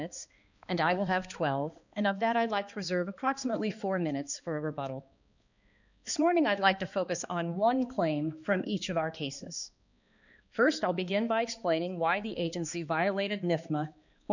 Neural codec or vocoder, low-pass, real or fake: codec, 16 kHz, 2 kbps, X-Codec, HuBERT features, trained on balanced general audio; 7.2 kHz; fake